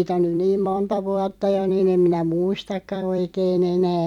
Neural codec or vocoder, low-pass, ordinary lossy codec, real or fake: vocoder, 44.1 kHz, 128 mel bands every 256 samples, BigVGAN v2; 19.8 kHz; none; fake